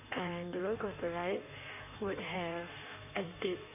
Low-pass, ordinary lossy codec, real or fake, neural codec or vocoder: 3.6 kHz; none; fake; codec, 16 kHz in and 24 kHz out, 1.1 kbps, FireRedTTS-2 codec